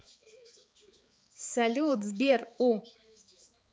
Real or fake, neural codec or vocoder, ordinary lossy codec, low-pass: fake; codec, 16 kHz, 4 kbps, X-Codec, HuBERT features, trained on balanced general audio; none; none